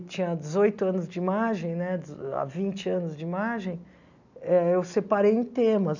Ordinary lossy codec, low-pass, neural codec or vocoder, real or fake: none; 7.2 kHz; none; real